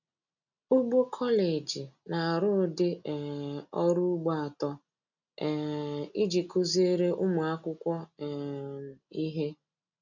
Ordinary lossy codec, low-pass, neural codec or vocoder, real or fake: none; 7.2 kHz; none; real